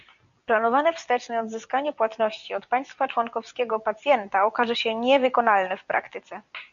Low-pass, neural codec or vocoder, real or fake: 7.2 kHz; none; real